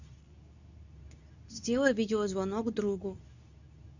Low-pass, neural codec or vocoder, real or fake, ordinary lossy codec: 7.2 kHz; codec, 24 kHz, 0.9 kbps, WavTokenizer, medium speech release version 2; fake; none